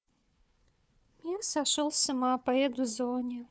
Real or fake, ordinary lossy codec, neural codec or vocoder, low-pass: fake; none; codec, 16 kHz, 4 kbps, FunCodec, trained on Chinese and English, 50 frames a second; none